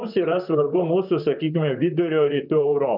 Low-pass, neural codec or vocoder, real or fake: 5.4 kHz; vocoder, 44.1 kHz, 128 mel bands, Pupu-Vocoder; fake